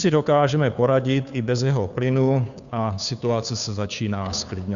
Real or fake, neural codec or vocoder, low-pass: fake; codec, 16 kHz, 2 kbps, FunCodec, trained on Chinese and English, 25 frames a second; 7.2 kHz